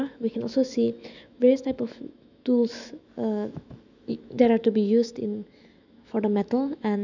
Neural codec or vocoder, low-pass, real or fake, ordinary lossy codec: autoencoder, 48 kHz, 128 numbers a frame, DAC-VAE, trained on Japanese speech; 7.2 kHz; fake; none